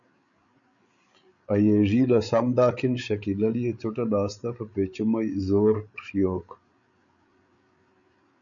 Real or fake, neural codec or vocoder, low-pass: fake; codec, 16 kHz, 8 kbps, FreqCodec, larger model; 7.2 kHz